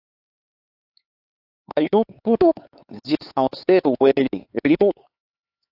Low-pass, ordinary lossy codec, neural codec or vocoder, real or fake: 5.4 kHz; AAC, 32 kbps; codec, 16 kHz, 4 kbps, X-Codec, HuBERT features, trained on LibriSpeech; fake